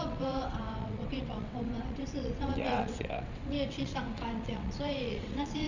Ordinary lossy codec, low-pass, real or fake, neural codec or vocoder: none; 7.2 kHz; fake; vocoder, 22.05 kHz, 80 mel bands, WaveNeXt